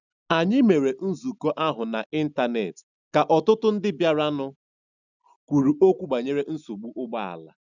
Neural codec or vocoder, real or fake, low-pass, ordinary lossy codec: none; real; 7.2 kHz; none